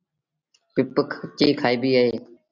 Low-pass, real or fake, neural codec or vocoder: 7.2 kHz; real; none